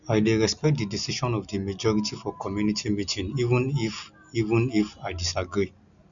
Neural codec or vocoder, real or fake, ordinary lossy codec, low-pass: none; real; none; 7.2 kHz